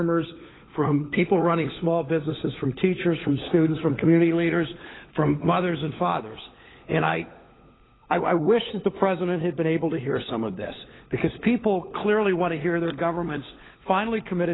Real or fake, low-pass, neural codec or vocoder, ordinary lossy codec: fake; 7.2 kHz; codec, 16 kHz, 16 kbps, FunCodec, trained on LibriTTS, 50 frames a second; AAC, 16 kbps